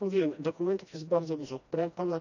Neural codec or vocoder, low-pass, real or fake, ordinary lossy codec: codec, 16 kHz, 1 kbps, FreqCodec, smaller model; 7.2 kHz; fake; none